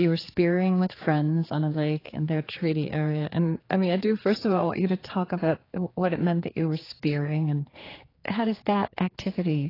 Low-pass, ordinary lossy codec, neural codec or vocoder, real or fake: 5.4 kHz; AAC, 24 kbps; codec, 16 kHz, 4 kbps, X-Codec, HuBERT features, trained on general audio; fake